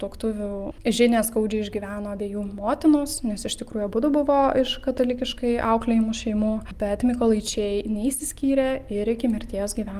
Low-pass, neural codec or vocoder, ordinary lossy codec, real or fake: 14.4 kHz; none; Opus, 32 kbps; real